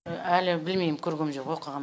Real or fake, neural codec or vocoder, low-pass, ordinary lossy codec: real; none; none; none